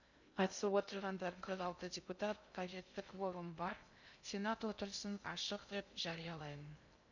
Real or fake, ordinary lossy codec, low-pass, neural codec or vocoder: fake; none; 7.2 kHz; codec, 16 kHz in and 24 kHz out, 0.6 kbps, FocalCodec, streaming, 2048 codes